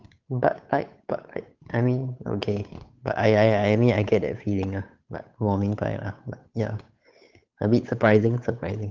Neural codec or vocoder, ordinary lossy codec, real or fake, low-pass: codec, 16 kHz, 8 kbps, FunCodec, trained on Chinese and English, 25 frames a second; Opus, 24 kbps; fake; 7.2 kHz